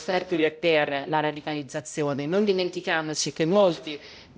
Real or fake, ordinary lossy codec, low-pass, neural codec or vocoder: fake; none; none; codec, 16 kHz, 0.5 kbps, X-Codec, HuBERT features, trained on balanced general audio